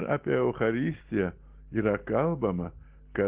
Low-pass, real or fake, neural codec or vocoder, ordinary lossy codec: 3.6 kHz; real; none; Opus, 16 kbps